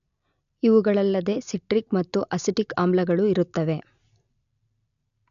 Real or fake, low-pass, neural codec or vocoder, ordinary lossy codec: real; 7.2 kHz; none; none